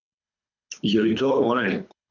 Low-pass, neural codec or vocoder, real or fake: 7.2 kHz; codec, 24 kHz, 3 kbps, HILCodec; fake